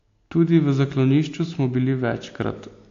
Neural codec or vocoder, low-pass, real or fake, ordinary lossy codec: none; 7.2 kHz; real; AAC, 48 kbps